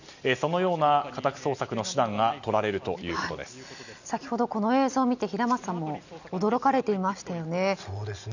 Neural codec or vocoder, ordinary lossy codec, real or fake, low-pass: none; none; real; 7.2 kHz